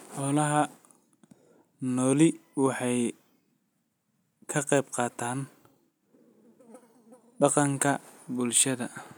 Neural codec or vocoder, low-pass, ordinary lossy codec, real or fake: none; none; none; real